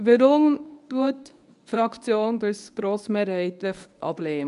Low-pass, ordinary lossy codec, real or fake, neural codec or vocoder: 10.8 kHz; none; fake; codec, 24 kHz, 0.9 kbps, WavTokenizer, medium speech release version 1